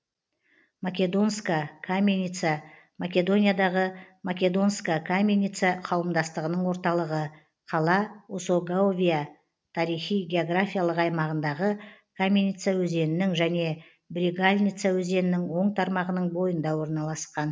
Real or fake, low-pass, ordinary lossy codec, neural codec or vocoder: real; none; none; none